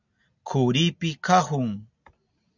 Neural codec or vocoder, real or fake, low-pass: none; real; 7.2 kHz